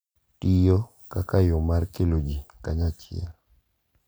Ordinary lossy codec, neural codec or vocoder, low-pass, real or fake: none; none; none; real